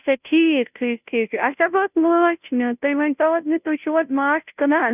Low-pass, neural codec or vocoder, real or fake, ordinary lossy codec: 3.6 kHz; codec, 16 kHz, 0.5 kbps, FunCodec, trained on Chinese and English, 25 frames a second; fake; none